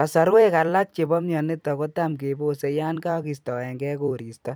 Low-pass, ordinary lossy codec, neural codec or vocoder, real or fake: none; none; vocoder, 44.1 kHz, 128 mel bands every 256 samples, BigVGAN v2; fake